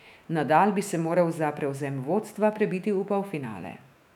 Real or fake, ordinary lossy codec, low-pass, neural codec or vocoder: fake; none; 19.8 kHz; autoencoder, 48 kHz, 128 numbers a frame, DAC-VAE, trained on Japanese speech